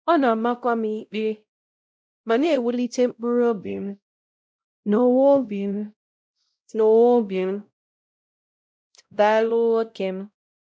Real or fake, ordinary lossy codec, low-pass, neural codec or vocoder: fake; none; none; codec, 16 kHz, 0.5 kbps, X-Codec, WavLM features, trained on Multilingual LibriSpeech